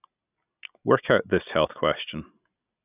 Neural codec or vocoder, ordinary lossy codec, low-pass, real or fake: none; none; 3.6 kHz; real